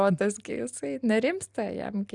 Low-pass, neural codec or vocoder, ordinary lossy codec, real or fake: 10.8 kHz; none; Opus, 64 kbps; real